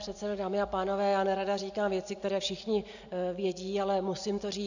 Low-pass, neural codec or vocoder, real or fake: 7.2 kHz; none; real